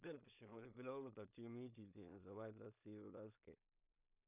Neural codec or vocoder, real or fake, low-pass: codec, 16 kHz in and 24 kHz out, 0.4 kbps, LongCat-Audio-Codec, two codebook decoder; fake; 3.6 kHz